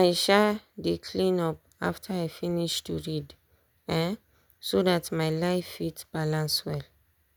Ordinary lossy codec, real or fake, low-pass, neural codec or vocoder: none; real; none; none